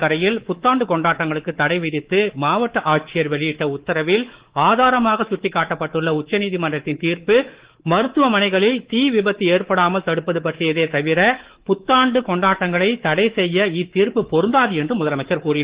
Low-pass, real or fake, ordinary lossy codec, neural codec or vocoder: 3.6 kHz; fake; Opus, 16 kbps; codec, 24 kHz, 3.1 kbps, DualCodec